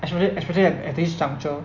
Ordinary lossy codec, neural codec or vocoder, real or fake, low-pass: none; none; real; 7.2 kHz